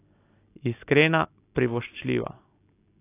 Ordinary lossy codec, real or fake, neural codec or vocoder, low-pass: AAC, 24 kbps; real; none; 3.6 kHz